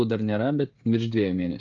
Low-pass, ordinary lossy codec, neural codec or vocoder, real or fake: 7.2 kHz; Opus, 24 kbps; codec, 16 kHz, 16 kbps, FreqCodec, smaller model; fake